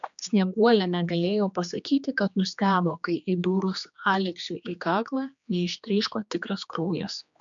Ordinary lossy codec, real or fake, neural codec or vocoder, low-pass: MP3, 64 kbps; fake; codec, 16 kHz, 2 kbps, X-Codec, HuBERT features, trained on general audio; 7.2 kHz